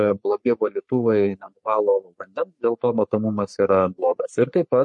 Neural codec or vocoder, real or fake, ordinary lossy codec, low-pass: codec, 44.1 kHz, 3.4 kbps, Pupu-Codec; fake; MP3, 48 kbps; 10.8 kHz